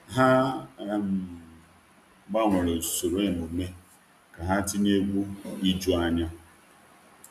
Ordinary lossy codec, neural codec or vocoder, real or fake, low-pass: none; none; real; 14.4 kHz